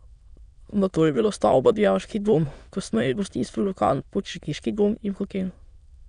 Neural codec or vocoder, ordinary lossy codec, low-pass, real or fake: autoencoder, 22.05 kHz, a latent of 192 numbers a frame, VITS, trained on many speakers; none; 9.9 kHz; fake